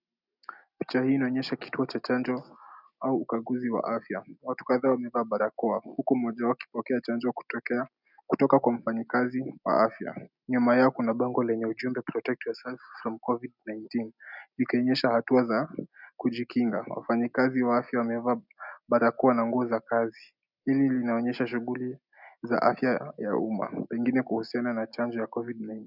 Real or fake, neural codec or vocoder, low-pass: real; none; 5.4 kHz